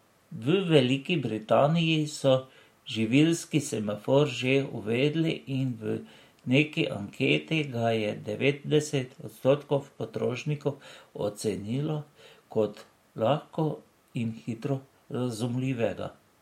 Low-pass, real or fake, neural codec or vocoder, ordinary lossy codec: 19.8 kHz; real; none; MP3, 64 kbps